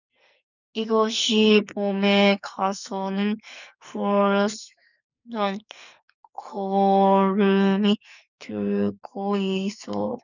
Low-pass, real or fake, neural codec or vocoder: 7.2 kHz; fake; codec, 44.1 kHz, 2.6 kbps, SNAC